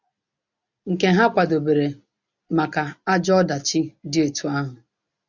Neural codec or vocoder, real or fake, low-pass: none; real; 7.2 kHz